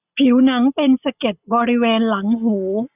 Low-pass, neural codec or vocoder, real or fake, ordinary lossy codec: 3.6 kHz; none; real; none